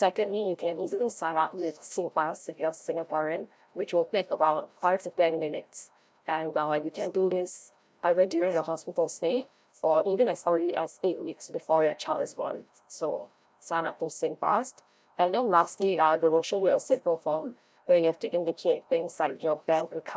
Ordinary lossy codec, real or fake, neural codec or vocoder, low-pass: none; fake; codec, 16 kHz, 0.5 kbps, FreqCodec, larger model; none